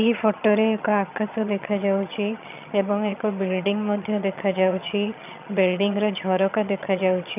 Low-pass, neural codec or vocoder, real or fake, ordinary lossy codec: 3.6 kHz; vocoder, 22.05 kHz, 80 mel bands, HiFi-GAN; fake; none